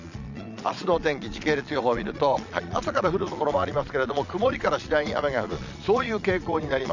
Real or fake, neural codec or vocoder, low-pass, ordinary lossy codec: fake; vocoder, 22.05 kHz, 80 mel bands, Vocos; 7.2 kHz; none